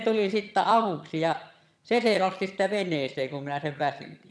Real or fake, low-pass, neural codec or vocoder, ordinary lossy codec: fake; none; vocoder, 22.05 kHz, 80 mel bands, HiFi-GAN; none